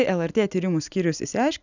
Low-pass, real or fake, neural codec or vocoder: 7.2 kHz; real; none